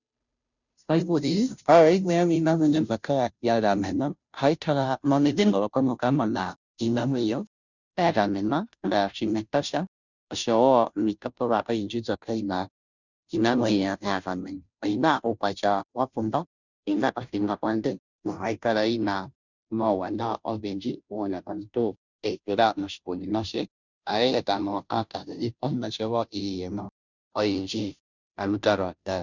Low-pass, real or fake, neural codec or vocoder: 7.2 kHz; fake; codec, 16 kHz, 0.5 kbps, FunCodec, trained on Chinese and English, 25 frames a second